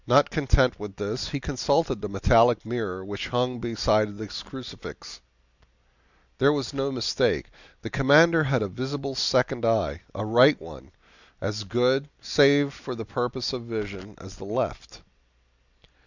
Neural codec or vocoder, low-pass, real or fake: none; 7.2 kHz; real